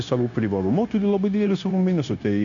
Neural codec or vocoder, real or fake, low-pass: codec, 16 kHz, 0.9 kbps, LongCat-Audio-Codec; fake; 7.2 kHz